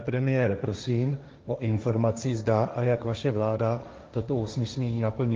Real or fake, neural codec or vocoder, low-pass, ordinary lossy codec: fake; codec, 16 kHz, 1.1 kbps, Voila-Tokenizer; 7.2 kHz; Opus, 32 kbps